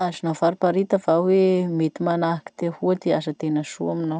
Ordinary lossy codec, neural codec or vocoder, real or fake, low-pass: none; none; real; none